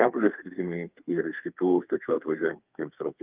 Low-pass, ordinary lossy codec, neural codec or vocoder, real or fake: 3.6 kHz; Opus, 32 kbps; codec, 32 kHz, 1.9 kbps, SNAC; fake